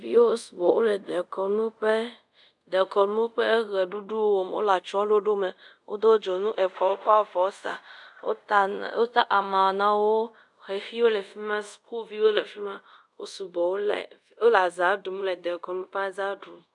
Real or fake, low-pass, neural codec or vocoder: fake; 10.8 kHz; codec, 24 kHz, 0.5 kbps, DualCodec